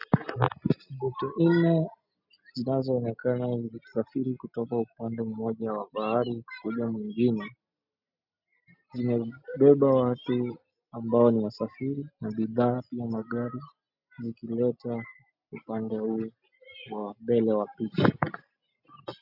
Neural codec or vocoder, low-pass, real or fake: none; 5.4 kHz; real